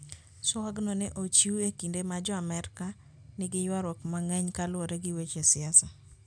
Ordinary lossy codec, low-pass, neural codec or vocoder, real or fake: none; 9.9 kHz; none; real